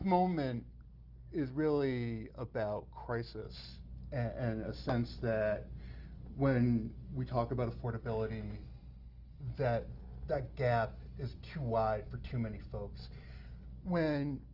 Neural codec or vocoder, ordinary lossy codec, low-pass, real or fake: none; Opus, 24 kbps; 5.4 kHz; real